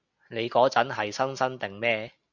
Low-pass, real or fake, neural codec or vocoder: 7.2 kHz; real; none